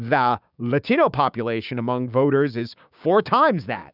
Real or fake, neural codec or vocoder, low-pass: fake; codec, 16 kHz, 6 kbps, DAC; 5.4 kHz